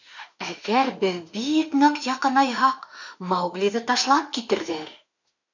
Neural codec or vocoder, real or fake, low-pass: autoencoder, 48 kHz, 32 numbers a frame, DAC-VAE, trained on Japanese speech; fake; 7.2 kHz